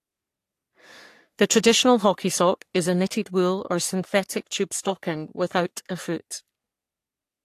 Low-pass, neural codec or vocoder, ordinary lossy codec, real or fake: 14.4 kHz; codec, 44.1 kHz, 3.4 kbps, Pupu-Codec; AAC, 64 kbps; fake